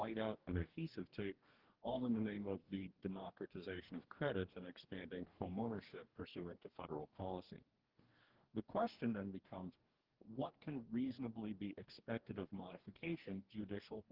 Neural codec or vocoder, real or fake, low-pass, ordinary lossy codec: codec, 44.1 kHz, 2.6 kbps, DAC; fake; 5.4 kHz; Opus, 16 kbps